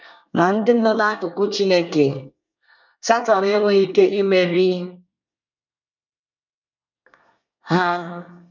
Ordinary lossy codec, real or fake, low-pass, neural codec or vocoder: none; fake; 7.2 kHz; codec, 24 kHz, 1 kbps, SNAC